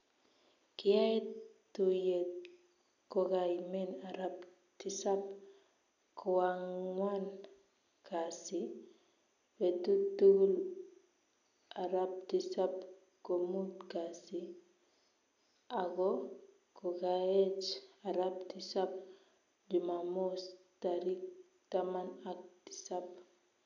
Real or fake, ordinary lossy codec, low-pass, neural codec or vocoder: real; none; 7.2 kHz; none